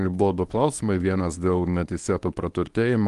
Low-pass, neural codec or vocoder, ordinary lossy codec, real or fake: 10.8 kHz; codec, 24 kHz, 0.9 kbps, WavTokenizer, medium speech release version 1; Opus, 24 kbps; fake